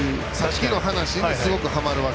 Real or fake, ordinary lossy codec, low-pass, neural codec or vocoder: real; none; none; none